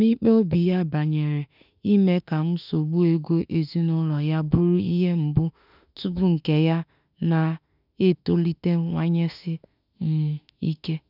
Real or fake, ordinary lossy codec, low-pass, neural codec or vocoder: fake; none; 5.4 kHz; autoencoder, 48 kHz, 32 numbers a frame, DAC-VAE, trained on Japanese speech